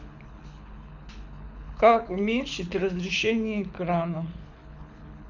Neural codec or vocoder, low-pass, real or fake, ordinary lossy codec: codec, 24 kHz, 6 kbps, HILCodec; 7.2 kHz; fake; none